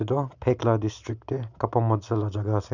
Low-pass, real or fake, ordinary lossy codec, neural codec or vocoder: 7.2 kHz; real; none; none